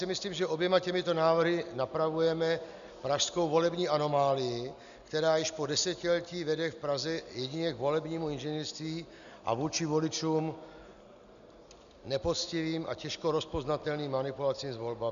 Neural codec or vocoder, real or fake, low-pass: none; real; 7.2 kHz